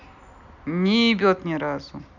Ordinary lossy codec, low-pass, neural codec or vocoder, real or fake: none; 7.2 kHz; none; real